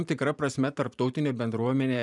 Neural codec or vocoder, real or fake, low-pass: vocoder, 44.1 kHz, 128 mel bands every 512 samples, BigVGAN v2; fake; 10.8 kHz